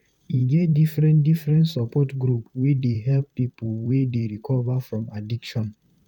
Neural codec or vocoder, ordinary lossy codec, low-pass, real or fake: vocoder, 44.1 kHz, 128 mel bands, Pupu-Vocoder; MP3, 96 kbps; 19.8 kHz; fake